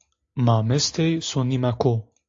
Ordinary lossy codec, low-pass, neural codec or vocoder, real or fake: AAC, 48 kbps; 7.2 kHz; none; real